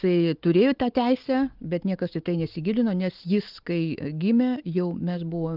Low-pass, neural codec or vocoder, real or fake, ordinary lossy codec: 5.4 kHz; none; real; Opus, 32 kbps